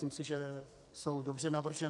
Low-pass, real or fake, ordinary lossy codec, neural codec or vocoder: 10.8 kHz; fake; AAC, 64 kbps; codec, 44.1 kHz, 2.6 kbps, SNAC